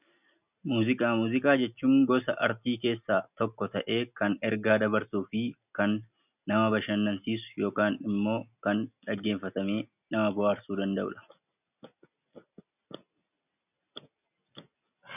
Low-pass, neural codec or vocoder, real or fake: 3.6 kHz; none; real